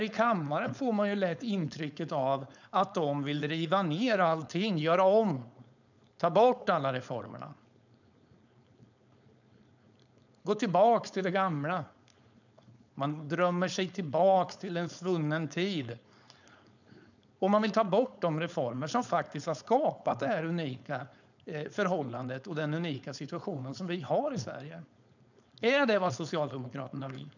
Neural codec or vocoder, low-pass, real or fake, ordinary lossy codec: codec, 16 kHz, 4.8 kbps, FACodec; 7.2 kHz; fake; none